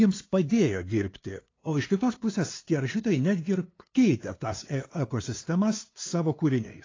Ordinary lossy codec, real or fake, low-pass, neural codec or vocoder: AAC, 32 kbps; fake; 7.2 kHz; codec, 16 kHz, 2 kbps, FunCodec, trained on LibriTTS, 25 frames a second